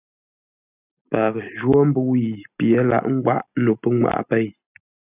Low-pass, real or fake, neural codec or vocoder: 3.6 kHz; real; none